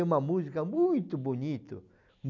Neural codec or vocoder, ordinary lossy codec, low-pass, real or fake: none; none; 7.2 kHz; real